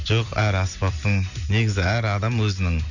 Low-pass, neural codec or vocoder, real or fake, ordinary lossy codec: 7.2 kHz; none; real; none